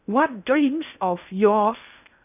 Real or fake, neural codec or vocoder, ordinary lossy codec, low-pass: fake; codec, 16 kHz in and 24 kHz out, 0.6 kbps, FocalCodec, streaming, 2048 codes; none; 3.6 kHz